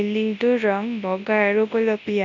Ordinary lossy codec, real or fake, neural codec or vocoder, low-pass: none; fake; codec, 24 kHz, 0.9 kbps, WavTokenizer, large speech release; 7.2 kHz